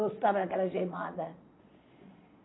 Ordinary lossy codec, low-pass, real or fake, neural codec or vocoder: AAC, 16 kbps; 7.2 kHz; fake; vocoder, 22.05 kHz, 80 mel bands, Vocos